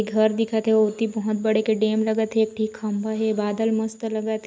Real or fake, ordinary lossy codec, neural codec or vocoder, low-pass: real; none; none; none